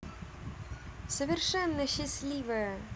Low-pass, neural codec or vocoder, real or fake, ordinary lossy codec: none; none; real; none